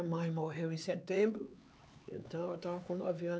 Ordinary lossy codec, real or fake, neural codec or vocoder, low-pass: none; fake; codec, 16 kHz, 4 kbps, X-Codec, HuBERT features, trained on LibriSpeech; none